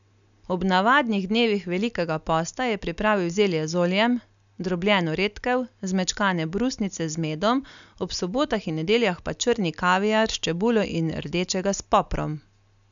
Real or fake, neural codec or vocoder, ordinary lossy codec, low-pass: real; none; none; 7.2 kHz